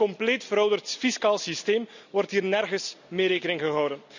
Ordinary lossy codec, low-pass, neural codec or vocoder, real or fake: none; 7.2 kHz; none; real